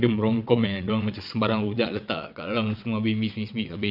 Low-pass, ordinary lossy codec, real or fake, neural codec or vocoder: 5.4 kHz; none; fake; vocoder, 22.05 kHz, 80 mel bands, WaveNeXt